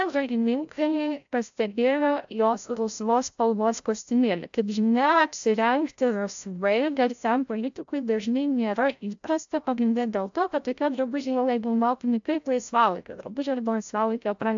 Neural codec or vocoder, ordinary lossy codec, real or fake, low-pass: codec, 16 kHz, 0.5 kbps, FreqCodec, larger model; AAC, 64 kbps; fake; 7.2 kHz